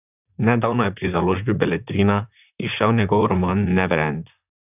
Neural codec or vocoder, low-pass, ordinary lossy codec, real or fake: vocoder, 44.1 kHz, 128 mel bands, Pupu-Vocoder; 3.6 kHz; none; fake